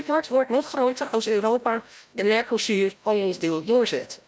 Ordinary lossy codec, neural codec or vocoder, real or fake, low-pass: none; codec, 16 kHz, 0.5 kbps, FreqCodec, larger model; fake; none